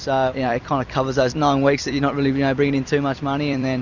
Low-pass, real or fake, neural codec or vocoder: 7.2 kHz; fake; vocoder, 44.1 kHz, 128 mel bands every 256 samples, BigVGAN v2